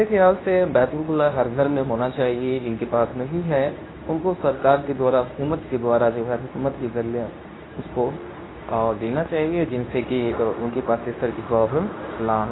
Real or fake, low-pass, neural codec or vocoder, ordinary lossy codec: fake; 7.2 kHz; codec, 24 kHz, 0.9 kbps, WavTokenizer, medium speech release version 2; AAC, 16 kbps